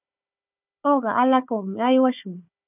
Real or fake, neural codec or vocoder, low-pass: fake; codec, 16 kHz, 4 kbps, FunCodec, trained on Chinese and English, 50 frames a second; 3.6 kHz